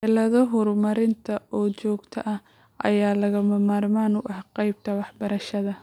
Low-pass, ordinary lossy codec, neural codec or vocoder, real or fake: 19.8 kHz; none; none; real